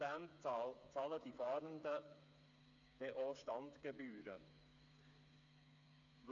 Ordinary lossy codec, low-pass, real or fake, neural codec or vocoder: none; 7.2 kHz; fake; codec, 16 kHz, 4 kbps, FreqCodec, smaller model